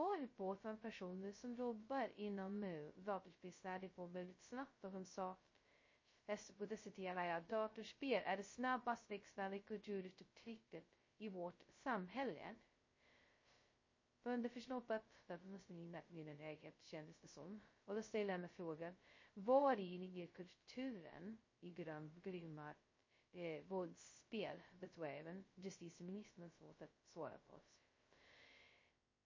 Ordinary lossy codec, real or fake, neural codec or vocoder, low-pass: MP3, 32 kbps; fake; codec, 16 kHz, 0.2 kbps, FocalCodec; 7.2 kHz